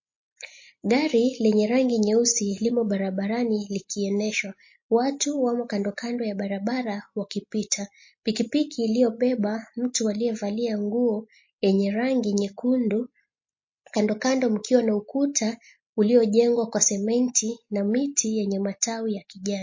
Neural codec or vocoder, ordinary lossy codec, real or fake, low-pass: none; MP3, 32 kbps; real; 7.2 kHz